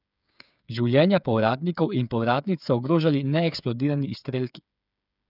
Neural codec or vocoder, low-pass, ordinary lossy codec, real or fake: codec, 16 kHz, 8 kbps, FreqCodec, smaller model; 5.4 kHz; none; fake